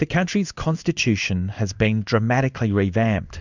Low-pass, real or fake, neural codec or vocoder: 7.2 kHz; fake; codec, 16 kHz in and 24 kHz out, 1 kbps, XY-Tokenizer